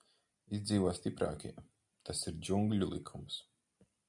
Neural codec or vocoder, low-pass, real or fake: none; 10.8 kHz; real